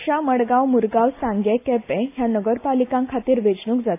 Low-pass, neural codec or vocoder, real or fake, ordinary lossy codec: 3.6 kHz; none; real; AAC, 24 kbps